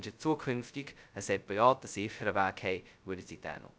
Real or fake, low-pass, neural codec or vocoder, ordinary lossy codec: fake; none; codec, 16 kHz, 0.2 kbps, FocalCodec; none